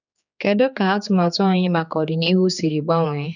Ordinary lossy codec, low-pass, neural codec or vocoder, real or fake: none; 7.2 kHz; codec, 16 kHz, 4 kbps, X-Codec, HuBERT features, trained on general audio; fake